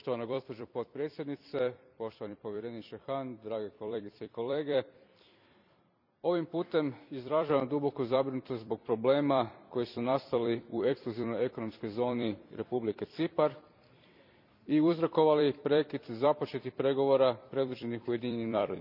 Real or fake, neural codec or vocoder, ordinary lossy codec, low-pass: fake; vocoder, 44.1 kHz, 128 mel bands every 256 samples, BigVGAN v2; none; 5.4 kHz